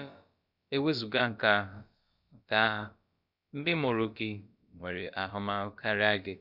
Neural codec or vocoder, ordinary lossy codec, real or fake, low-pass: codec, 16 kHz, about 1 kbps, DyCAST, with the encoder's durations; none; fake; 5.4 kHz